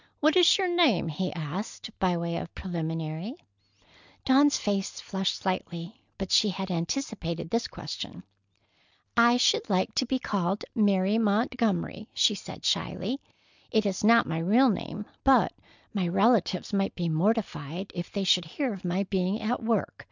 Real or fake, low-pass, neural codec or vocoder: real; 7.2 kHz; none